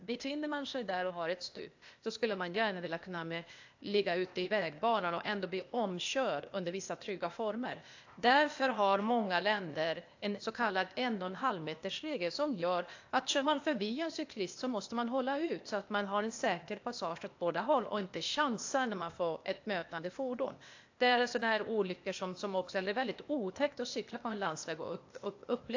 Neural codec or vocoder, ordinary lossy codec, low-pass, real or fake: codec, 16 kHz, 0.8 kbps, ZipCodec; AAC, 48 kbps; 7.2 kHz; fake